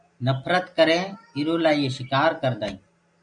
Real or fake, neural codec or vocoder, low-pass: real; none; 9.9 kHz